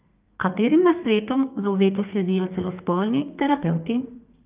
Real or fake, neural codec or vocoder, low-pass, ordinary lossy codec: fake; codec, 32 kHz, 1.9 kbps, SNAC; 3.6 kHz; Opus, 24 kbps